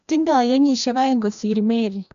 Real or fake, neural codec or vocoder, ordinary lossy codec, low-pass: fake; codec, 16 kHz, 1 kbps, FreqCodec, larger model; none; 7.2 kHz